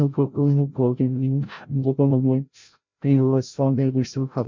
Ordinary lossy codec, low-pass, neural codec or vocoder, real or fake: MP3, 48 kbps; 7.2 kHz; codec, 16 kHz, 0.5 kbps, FreqCodec, larger model; fake